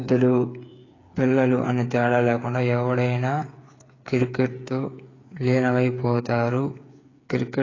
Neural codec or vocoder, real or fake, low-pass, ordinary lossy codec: codec, 16 kHz, 8 kbps, FreqCodec, smaller model; fake; 7.2 kHz; AAC, 32 kbps